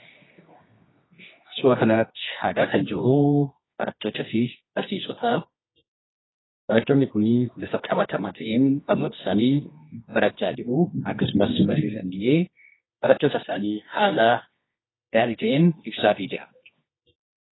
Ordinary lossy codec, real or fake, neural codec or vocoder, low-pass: AAC, 16 kbps; fake; codec, 24 kHz, 0.9 kbps, WavTokenizer, medium music audio release; 7.2 kHz